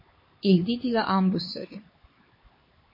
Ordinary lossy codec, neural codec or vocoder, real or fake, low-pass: MP3, 24 kbps; codec, 16 kHz, 4 kbps, X-Codec, HuBERT features, trained on LibriSpeech; fake; 5.4 kHz